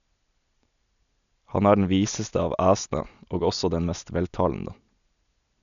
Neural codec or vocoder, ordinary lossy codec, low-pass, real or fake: none; none; 7.2 kHz; real